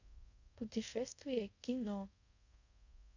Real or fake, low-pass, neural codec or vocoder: fake; 7.2 kHz; codec, 24 kHz, 0.5 kbps, DualCodec